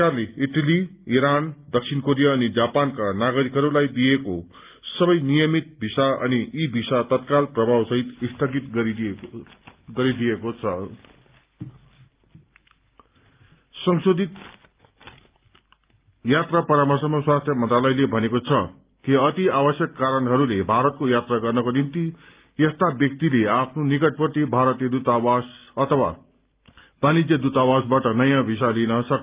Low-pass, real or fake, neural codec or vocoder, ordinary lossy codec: 3.6 kHz; real; none; Opus, 32 kbps